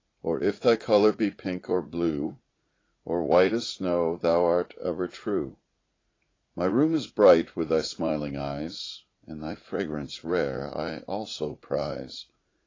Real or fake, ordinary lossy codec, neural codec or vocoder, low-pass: fake; AAC, 32 kbps; vocoder, 44.1 kHz, 80 mel bands, Vocos; 7.2 kHz